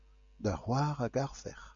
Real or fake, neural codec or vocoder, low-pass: real; none; 7.2 kHz